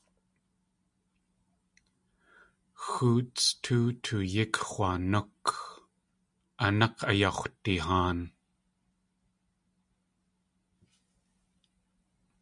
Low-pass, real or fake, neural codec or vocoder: 10.8 kHz; real; none